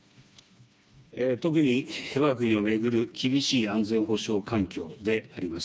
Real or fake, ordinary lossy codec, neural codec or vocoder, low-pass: fake; none; codec, 16 kHz, 2 kbps, FreqCodec, smaller model; none